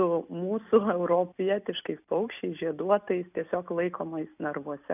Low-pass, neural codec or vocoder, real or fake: 3.6 kHz; none; real